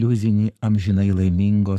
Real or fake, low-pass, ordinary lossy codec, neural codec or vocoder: fake; 14.4 kHz; AAC, 96 kbps; codec, 44.1 kHz, 7.8 kbps, Pupu-Codec